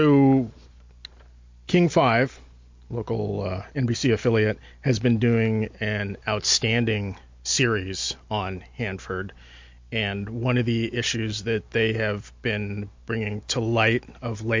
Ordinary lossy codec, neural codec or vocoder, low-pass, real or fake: MP3, 48 kbps; none; 7.2 kHz; real